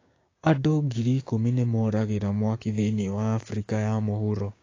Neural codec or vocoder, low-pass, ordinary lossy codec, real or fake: codec, 16 kHz, 6 kbps, DAC; 7.2 kHz; AAC, 32 kbps; fake